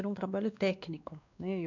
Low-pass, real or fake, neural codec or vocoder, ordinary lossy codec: 7.2 kHz; fake; codec, 16 kHz, 2 kbps, X-Codec, WavLM features, trained on Multilingual LibriSpeech; none